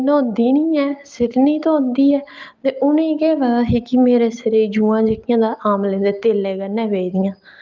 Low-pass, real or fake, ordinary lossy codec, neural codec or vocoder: 7.2 kHz; real; Opus, 32 kbps; none